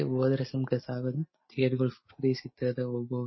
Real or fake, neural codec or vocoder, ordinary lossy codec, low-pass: real; none; MP3, 24 kbps; 7.2 kHz